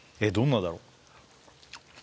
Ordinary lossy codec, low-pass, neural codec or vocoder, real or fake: none; none; none; real